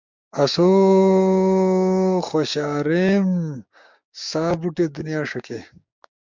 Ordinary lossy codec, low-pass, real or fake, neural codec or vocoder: MP3, 64 kbps; 7.2 kHz; fake; codec, 16 kHz, 6 kbps, DAC